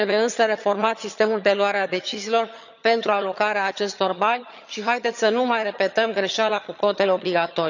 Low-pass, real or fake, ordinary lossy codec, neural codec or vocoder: 7.2 kHz; fake; none; vocoder, 22.05 kHz, 80 mel bands, HiFi-GAN